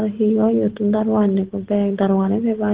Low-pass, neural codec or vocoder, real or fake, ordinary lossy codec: 3.6 kHz; none; real; Opus, 16 kbps